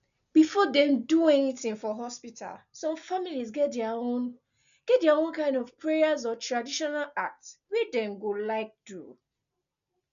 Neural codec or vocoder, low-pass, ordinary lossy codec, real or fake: none; 7.2 kHz; MP3, 96 kbps; real